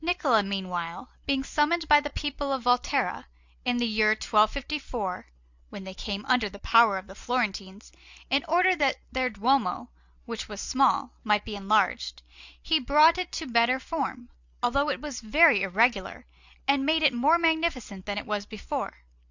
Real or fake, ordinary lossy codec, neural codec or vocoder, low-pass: real; Opus, 64 kbps; none; 7.2 kHz